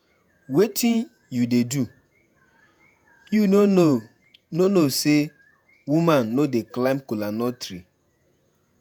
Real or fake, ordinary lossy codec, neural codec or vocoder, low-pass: fake; none; vocoder, 48 kHz, 128 mel bands, Vocos; none